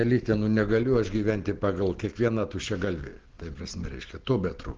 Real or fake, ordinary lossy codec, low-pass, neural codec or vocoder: real; Opus, 16 kbps; 7.2 kHz; none